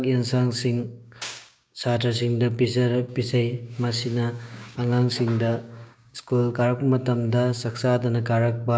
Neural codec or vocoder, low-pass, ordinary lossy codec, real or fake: codec, 16 kHz, 6 kbps, DAC; none; none; fake